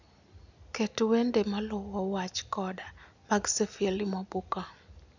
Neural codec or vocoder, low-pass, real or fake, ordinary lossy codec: none; 7.2 kHz; real; none